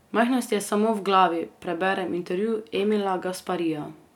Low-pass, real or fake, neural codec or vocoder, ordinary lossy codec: 19.8 kHz; real; none; none